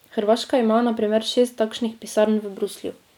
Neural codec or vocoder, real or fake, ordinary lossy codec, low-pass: none; real; none; 19.8 kHz